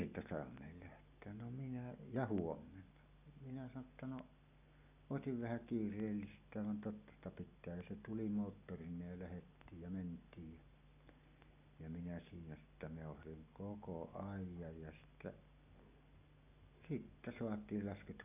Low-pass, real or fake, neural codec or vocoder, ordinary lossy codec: 3.6 kHz; real; none; none